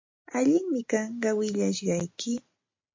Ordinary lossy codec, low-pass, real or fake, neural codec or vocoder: MP3, 48 kbps; 7.2 kHz; real; none